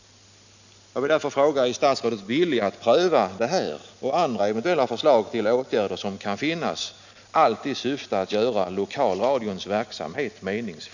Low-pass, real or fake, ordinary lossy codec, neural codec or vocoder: 7.2 kHz; real; none; none